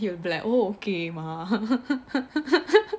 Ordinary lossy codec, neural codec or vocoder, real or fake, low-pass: none; none; real; none